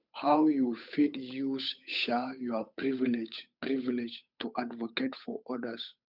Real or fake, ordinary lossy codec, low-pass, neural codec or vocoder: fake; none; 5.4 kHz; codec, 16 kHz, 8 kbps, FunCodec, trained on Chinese and English, 25 frames a second